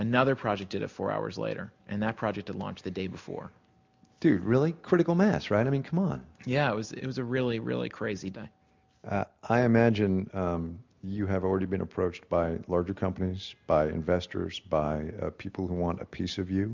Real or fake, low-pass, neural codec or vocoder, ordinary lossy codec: real; 7.2 kHz; none; MP3, 64 kbps